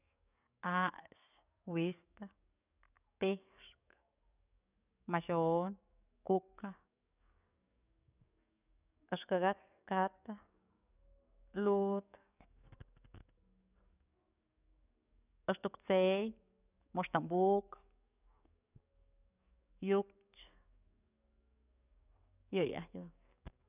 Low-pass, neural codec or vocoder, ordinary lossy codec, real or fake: 3.6 kHz; codec, 44.1 kHz, 7.8 kbps, DAC; none; fake